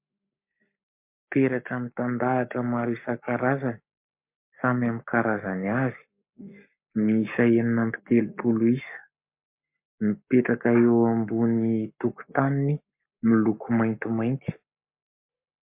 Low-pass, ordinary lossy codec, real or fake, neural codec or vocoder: 3.6 kHz; MP3, 32 kbps; fake; codec, 44.1 kHz, 7.8 kbps, Pupu-Codec